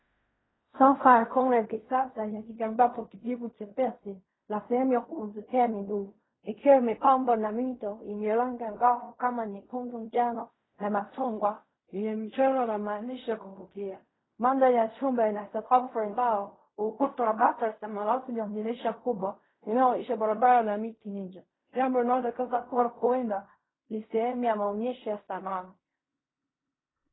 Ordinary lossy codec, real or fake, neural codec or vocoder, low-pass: AAC, 16 kbps; fake; codec, 16 kHz in and 24 kHz out, 0.4 kbps, LongCat-Audio-Codec, fine tuned four codebook decoder; 7.2 kHz